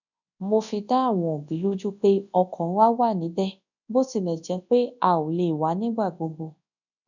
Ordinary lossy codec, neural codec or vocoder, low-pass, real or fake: none; codec, 24 kHz, 0.9 kbps, WavTokenizer, large speech release; 7.2 kHz; fake